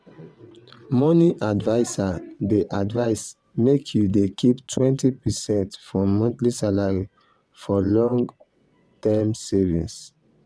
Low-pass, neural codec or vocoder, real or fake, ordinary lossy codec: none; vocoder, 22.05 kHz, 80 mel bands, WaveNeXt; fake; none